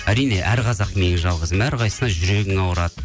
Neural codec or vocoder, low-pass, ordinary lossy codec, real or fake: none; none; none; real